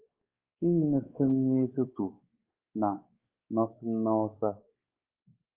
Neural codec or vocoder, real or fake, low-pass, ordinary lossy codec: codec, 16 kHz, 4 kbps, X-Codec, WavLM features, trained on Multilingual LibriSpeech; fake; 3.6 kHz; Opus, 32 kbps